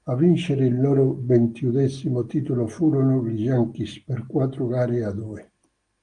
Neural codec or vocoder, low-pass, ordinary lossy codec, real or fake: none; 9.9 kHz; Opus, 24 kbps; real